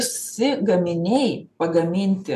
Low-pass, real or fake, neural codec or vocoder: 14.4 kHz; real; none